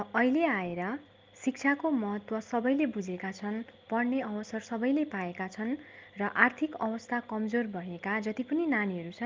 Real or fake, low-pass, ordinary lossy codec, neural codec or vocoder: real; 7.2 kHz; Opus, 32 kbps; none